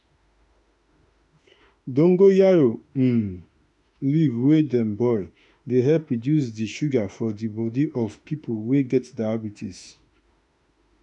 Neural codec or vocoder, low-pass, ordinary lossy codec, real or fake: autoencoder, 48 kHz, 32 numbers a frame, DAC-VAE, trained on Japanese speech; 10.8 kHz; none; fake